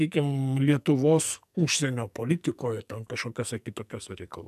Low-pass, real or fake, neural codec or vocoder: 14.4 kHz; fake; codec, 44.1 kHz, 2.6 kbps, SNAC